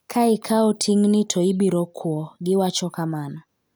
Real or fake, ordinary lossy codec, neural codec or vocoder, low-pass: real; none; none; none